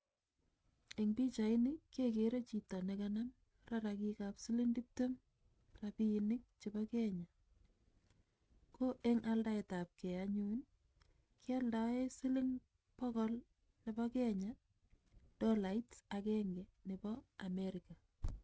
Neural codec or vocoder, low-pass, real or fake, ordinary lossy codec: none; none; real; none